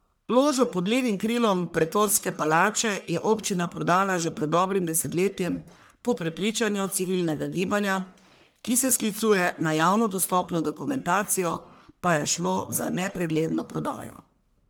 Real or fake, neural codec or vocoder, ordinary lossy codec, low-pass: fake; codec, 44.1 kHz, 1.7 kbps, Pupu-Codec; none; none